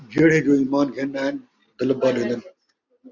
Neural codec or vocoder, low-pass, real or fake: none; 7.2 kHz; real